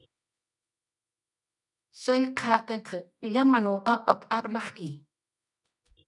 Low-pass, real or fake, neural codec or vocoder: 10.8 kHz; fake; codec, 24 kHz, 0.9 kbps, WavTokenizer, medium music audio release